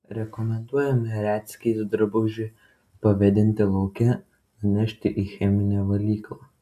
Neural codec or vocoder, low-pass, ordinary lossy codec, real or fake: none; 14.4 kHz; AAC, 96 kbps; real